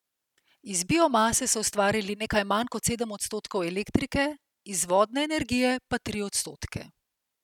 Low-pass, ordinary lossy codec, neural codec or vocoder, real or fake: 19.8 kHz; none; none; real